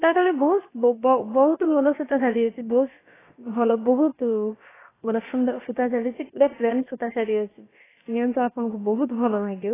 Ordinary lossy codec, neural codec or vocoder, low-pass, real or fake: AAC, 16 kbps; codec, 16 kHz, about 1 kbps, DyCAST, with the encoder's durations; 3.6 kHz; fake